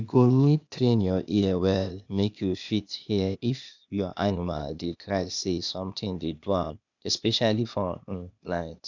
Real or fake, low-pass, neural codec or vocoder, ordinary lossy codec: fake; 7.2 kHz; codec, 16 kHz, 0.8 kbps, ZipCodec; none